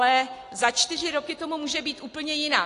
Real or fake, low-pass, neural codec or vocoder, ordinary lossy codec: real; 10.8 kHz; none; AAC, 48 kbps